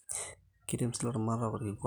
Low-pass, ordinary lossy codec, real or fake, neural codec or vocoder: 19.8 kHz; none; real; none